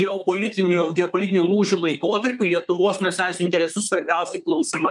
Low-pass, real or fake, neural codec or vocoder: 10.8 kHz; fake; codec, 24 kHz, 1 kbps, SNAC